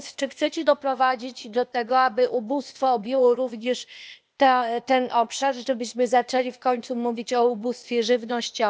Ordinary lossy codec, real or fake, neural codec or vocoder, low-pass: none; fake; codec, 16 kHz, 0.8 kbps, ZipCodec; none